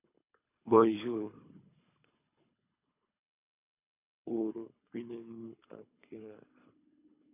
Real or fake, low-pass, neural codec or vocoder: fake; 3.6 kHz; codec, 24 kHz, 3 kbps, HILCodec